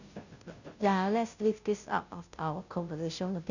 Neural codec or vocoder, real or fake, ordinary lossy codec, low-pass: codec, 16 kHz, 0.5 kbps, FunCodec, trained on Chinese and English, 25 frames a second; fake; none; 7.2 kHz